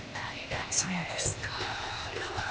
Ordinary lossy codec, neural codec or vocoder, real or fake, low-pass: none; codec, 16 kHz, 0.8 kbps, ZipCodec; fake; none